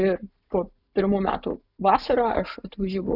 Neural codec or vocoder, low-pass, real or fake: none; 5.4 kHz; real